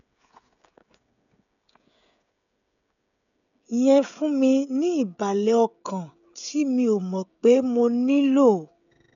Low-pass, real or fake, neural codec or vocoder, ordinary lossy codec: 7.2 kHz; fake; codec, 16 kHz, 16 kbps, FreqCodec, smaller model; none